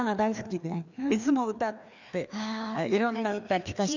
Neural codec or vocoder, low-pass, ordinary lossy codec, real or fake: codec, 16 kHz, 2 kbps, FreqCodec, larger model; 7.2 kHz; none; fake